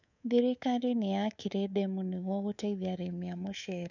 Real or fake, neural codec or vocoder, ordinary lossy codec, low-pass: fake; codec, 24 kHz, 3.1 kbps, DualCodec; none; 7.2 kHz